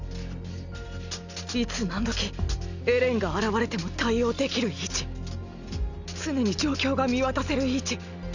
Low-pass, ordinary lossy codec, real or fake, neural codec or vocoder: 7.2 kHz; none; real; none